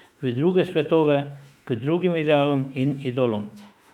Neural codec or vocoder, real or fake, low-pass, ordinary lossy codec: autoencoder, 48 kHz, 32 numbers a frame, DAC-VAE, trained on Japanese speech; fake; 19.8 kHz; none